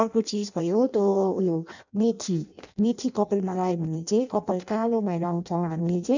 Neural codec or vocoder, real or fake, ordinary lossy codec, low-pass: codec, 16 kHz in and 24 kHz out, 0.6 kbps, FireRedTTS-2 codec; fake; none; 7.2 kHz